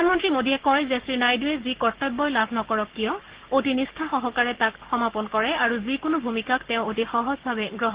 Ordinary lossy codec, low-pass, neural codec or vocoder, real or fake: Opus, 16 kbps; 3.6 kHz; codec, 16 kHz, 6 kbps, DAC; fake